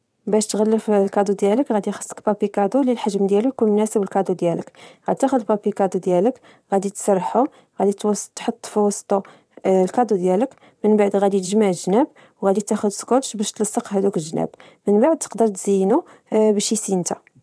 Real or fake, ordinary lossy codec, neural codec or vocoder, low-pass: fake; none; vocoder, 22.05 kHz, 80 mel bands, WaveNeXt; none